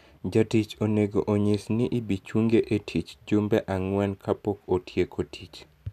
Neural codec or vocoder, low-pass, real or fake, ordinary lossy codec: none; 14.4 kHz; real; none